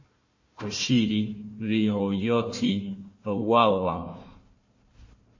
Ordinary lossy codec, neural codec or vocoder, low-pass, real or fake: MP3, 32 kbps; codec, 16 kHz, 1 kbps, FunCodec, trained on Chinese and English, 50 frames a second; 7.2 kHz; fake